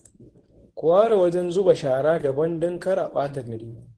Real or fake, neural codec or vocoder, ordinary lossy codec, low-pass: fake; codec, 24 kHz, 0.9 kbps, WavTokenizer, medium speech release version 1; Opus, 16 kbps; 10.8 kHz